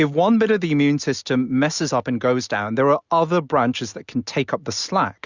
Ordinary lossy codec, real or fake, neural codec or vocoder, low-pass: Opus, 64 kbps; real; none; 7.2 kHz